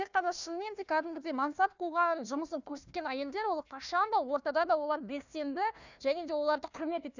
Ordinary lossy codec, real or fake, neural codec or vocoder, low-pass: none; fake; codec, 16 kHz, 1 kbps, FunCodec, trained on Chinese and English, 50 frames a second; 7.2 kHz